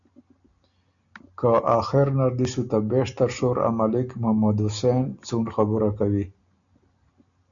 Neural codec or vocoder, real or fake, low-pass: none; real; 7.2 kHz